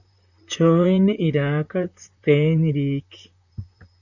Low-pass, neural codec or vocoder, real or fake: 7.2 kHz; vocoder, 44.1 kHz, 128 mel bands, Pupu-Vocoder; fake